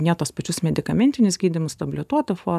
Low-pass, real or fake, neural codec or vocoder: 14.4 kHz; real; none